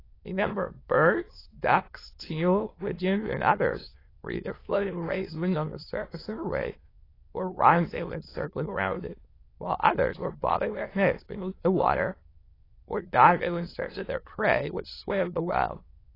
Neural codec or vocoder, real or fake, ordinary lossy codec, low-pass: autoencoder, 22.05 kHz, a latent of 192 numbers a frame, VITS, trained on many speakers; fake; AAC, 24 kbps; 5.4 kHz